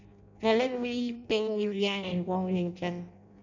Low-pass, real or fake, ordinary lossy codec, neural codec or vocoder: 7.2 kHz; fake; none; codec, 16 kHz in and 24 kHz out, 0.6 kbps, FireRedTTS-2 codec